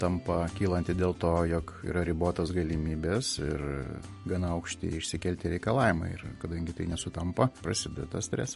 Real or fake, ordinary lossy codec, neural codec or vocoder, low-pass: real; MP3, 48 kbps; none; 14.4 kHz